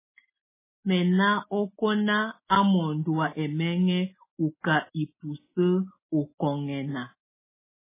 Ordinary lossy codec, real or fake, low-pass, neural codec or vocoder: MP3, 16 kbps; real; 3.6 kHz; none